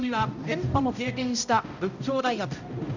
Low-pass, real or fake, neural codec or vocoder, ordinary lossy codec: 7.2 kHz; fake; codec, 16 kHz, 0.5 kbps, X-Codec, HuBERT features, trained on balanced general audio; none